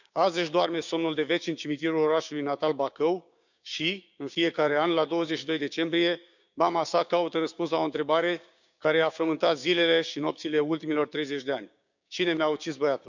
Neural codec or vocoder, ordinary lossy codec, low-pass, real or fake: codec, 16 kHz, 6 kbps, DAC; none; 7.2 kHz; fake